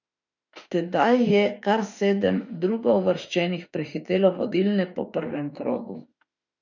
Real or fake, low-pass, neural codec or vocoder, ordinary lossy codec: fake; 7.2 kHz; autoencoder, 48 kHz, 32 numbers a frame, DAC-VAE, trained on Japanese speech; none